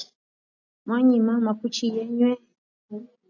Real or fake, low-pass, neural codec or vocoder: real; 7.2 kHz; none